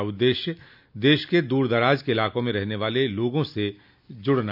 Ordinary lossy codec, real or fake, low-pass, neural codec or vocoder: none; real; 5.4 kHz; none